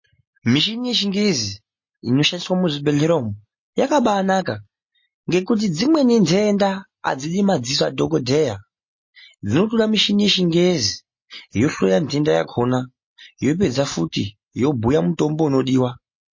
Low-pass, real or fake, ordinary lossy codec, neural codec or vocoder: 7.2 kHz; real; MP3, 32 kbps; none